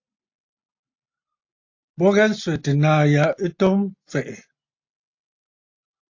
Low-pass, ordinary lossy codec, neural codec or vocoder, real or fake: 7.2 kHz; AAC, 48 kbps; none; real